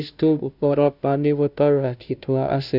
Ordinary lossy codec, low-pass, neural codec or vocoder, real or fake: none; 5.4 kHz; codec, 16 kHz, 0.5 kbps, FunCodec, trained on Chinese and English, 25 frames a second; fake